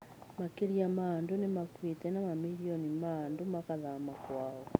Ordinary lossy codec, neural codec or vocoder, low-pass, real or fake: none; none; none; real